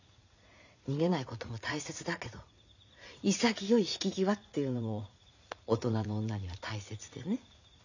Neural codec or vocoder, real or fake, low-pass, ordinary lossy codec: vocoder, 44.1 kHz, 80 mel bands, Vocos; fake; 7.2 kHz; none